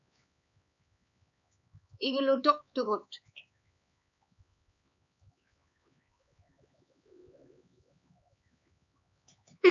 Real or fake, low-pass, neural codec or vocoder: fake; 7.2 kHz; codec, 16 kHz, 4 kbps, X-Codec, HuBERT features, trained on LibriSpeech